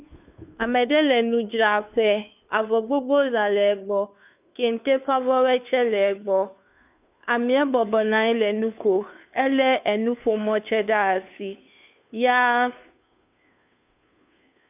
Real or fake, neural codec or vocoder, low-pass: fake; codec, 16 kHz, 2 kbps, FunCodec, trained on Chinese and English, 25 frames a second; 3.6 kHz